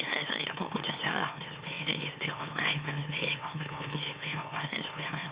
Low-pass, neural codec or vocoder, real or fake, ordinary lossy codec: 3.6 kHz; autoencoder, 44.1 kHz, a latent of 192 numbers a frame, MeloTTS; fake; Opus, 64 kbps